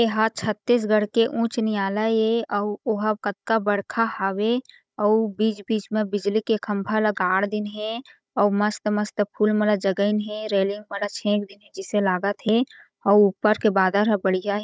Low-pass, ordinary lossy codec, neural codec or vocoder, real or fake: none; none; codec, 16 kHz, 16 kbps, FunCodec, trained on Chinese and English, 50 frames a second; fake